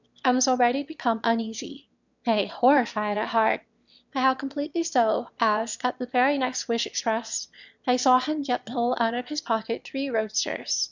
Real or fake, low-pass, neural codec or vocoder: fake; 7.2 kHz; autoencoder, 22.05 kHz, a latent of 192 numbers a frame, VITS, trained on one speaker